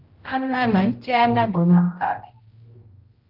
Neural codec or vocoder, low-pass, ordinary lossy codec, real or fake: codec, 16 kHz, 0.5 kbps, X-Codec, HuBERT features, trained on general audio; 5.4 kHz; Opus, 32 kbps; fake